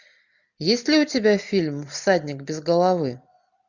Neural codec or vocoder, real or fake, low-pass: none; real; 7.2 kHz